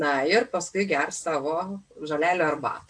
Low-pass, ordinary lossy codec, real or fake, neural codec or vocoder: 9.9 kHz; AAC, 64 kbps; real; none